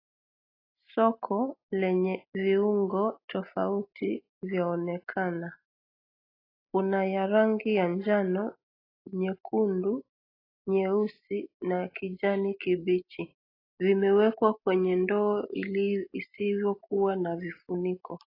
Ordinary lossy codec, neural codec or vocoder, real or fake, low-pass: AAC, 24 kbps; none; real; 5.4 kHz